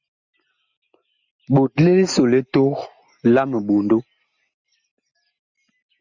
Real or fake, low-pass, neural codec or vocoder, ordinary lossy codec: real; 7.2 kHz; none; Opus, 64 kbps